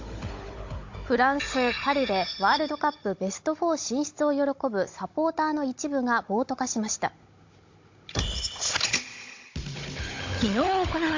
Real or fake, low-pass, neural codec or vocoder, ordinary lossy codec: fake; 7.2 kHz; codec, 16 kHz, 16 kbps, FunCodec, trained on Chinese and English, 50 frames a second; MP3, 48 kbps